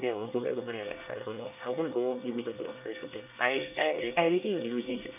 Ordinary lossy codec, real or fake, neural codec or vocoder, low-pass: none; fake; codec, 24 kHz, 1 kbps, SNAC; 3.6 kHz